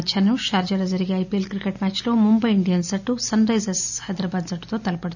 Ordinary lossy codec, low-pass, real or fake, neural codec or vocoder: none; 7.2 kHz; real; none